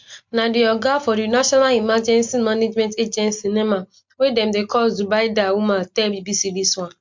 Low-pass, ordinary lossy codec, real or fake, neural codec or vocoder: 7.2 kHz; MP3, 48 kbps; real; none